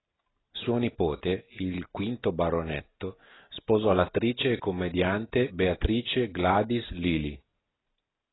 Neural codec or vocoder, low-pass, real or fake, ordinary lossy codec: none; 7.2 kHz; real; AAC, 16 kbps